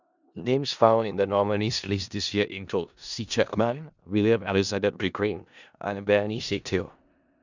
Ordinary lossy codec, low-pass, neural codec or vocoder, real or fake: none; 7.2 kHz; codec, 16 kHz in and 24 kHz out, 0.4 kbps, LongCat-Audio-Codec, four codebook decoder; fake